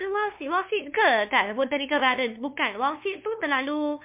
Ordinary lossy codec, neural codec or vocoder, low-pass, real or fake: MP3, 32 kbps; codec, 16 kHz, 2 kbps, FunCodec, trained on LibriTTS, 25 frames a second; 3.6 kHz; fake